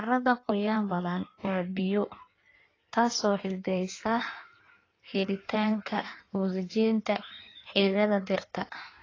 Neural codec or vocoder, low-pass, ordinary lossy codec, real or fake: codec, 16 kHz in and 24 kHz out, 1.1 kbps, FireRedTTS-2 codec; 7.2 kHz; AAC, 32 kbps; fake